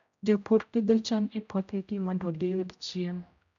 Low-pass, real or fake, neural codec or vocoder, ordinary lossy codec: 7.2 kHz; fake; codec, 16 kHz, 0.5 kbps, X-Codec, HuBERT features, trained on general audio; none